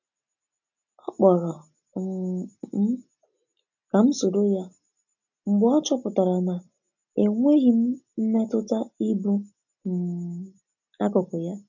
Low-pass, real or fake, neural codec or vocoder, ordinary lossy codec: 7.2 kHz; real; none; none